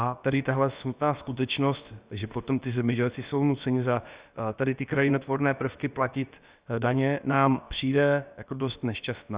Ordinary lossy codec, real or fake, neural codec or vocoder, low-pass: Opus, 64 kbps; fake; codec, 16 kHz, about 1 kbps, DyCAST, with the encoder's durations; 3.6 kHz